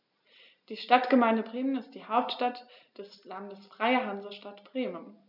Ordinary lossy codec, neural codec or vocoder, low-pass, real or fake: none; none; 5.4 kHz; real